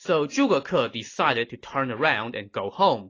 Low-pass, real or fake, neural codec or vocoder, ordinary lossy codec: 7.2 kHz; real; none; AAC, 32 kbps